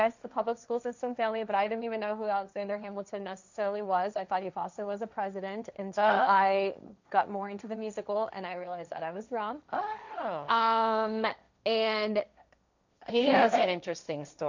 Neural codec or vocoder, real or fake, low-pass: codec, 16 kHz, 1.1 kbps, Voila-Tokenizer; fake; 7.2 kHz